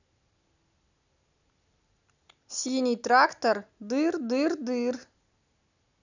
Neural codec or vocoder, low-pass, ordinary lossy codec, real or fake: none; 7.2 kHz; none; real